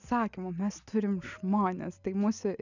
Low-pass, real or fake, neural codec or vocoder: 7.2 kHz; real; none